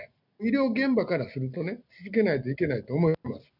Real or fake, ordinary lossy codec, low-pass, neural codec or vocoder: real; none; 5.4 kHz; none